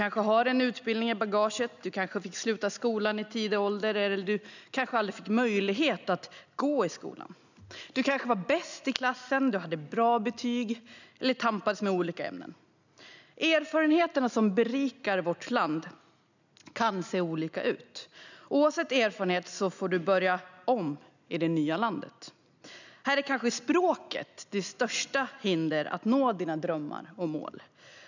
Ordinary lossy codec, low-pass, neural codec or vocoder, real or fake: none; 7.2 kHz; none; real